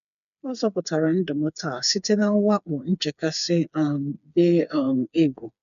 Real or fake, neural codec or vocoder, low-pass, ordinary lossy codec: fake; codec, 16 kHz, 4 kbps, FreqCodec, smaller model; 7.2 kHz; none